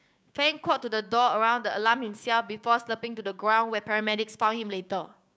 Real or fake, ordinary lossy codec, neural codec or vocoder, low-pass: fake; none; codec, 16 kHz, 6 kbps, DAC; none